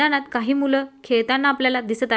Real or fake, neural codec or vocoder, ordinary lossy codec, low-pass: real; none; none; none